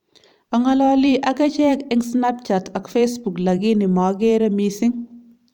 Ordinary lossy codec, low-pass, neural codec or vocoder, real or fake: none; 19.8 kHz; vocoder, 44.1 kHz, 128 mel bands every 512 samples, BigVGAN v2; fake